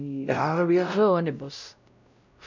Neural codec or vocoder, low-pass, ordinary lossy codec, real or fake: codec, 16 kHz, 0.5 kbps, X-Codec, WavLM features, trained on Multilingual LibriSpeech; 7.2 kHz; none; fake